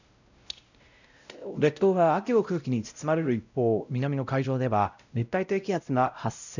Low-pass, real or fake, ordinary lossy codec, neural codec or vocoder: 7.2 kHz; fake; none; codec, 16 kHz, 0.5 kbps, X-Codec, WavLM features, trained on Multilingual LibriSpeech